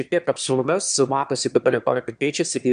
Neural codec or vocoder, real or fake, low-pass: autoencoder, 22.05 kHz, a latent of 192 numbers a frame, VITS, trained on one speaker; fake; 9.9 kHz